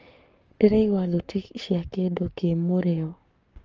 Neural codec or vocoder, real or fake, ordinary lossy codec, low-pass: codec, 44.1 kHz, 7.8 kbps, Pupu-Codec; fake; Opus, 24 kbps; 7.2 kHz